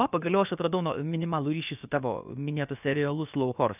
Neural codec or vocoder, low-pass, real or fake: codec, 16 kHz, about 1 kbps, DyCAST, with the encoder's durations; 3.6 kHz; fake